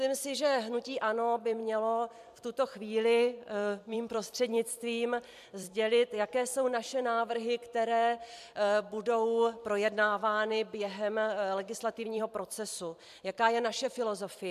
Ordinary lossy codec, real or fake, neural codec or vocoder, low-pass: MP3, 96 kbps; fake; vocoder, 44.1 kHz, 128 mel bands every 256 samples, BigVGAN v2; 14.4 kHz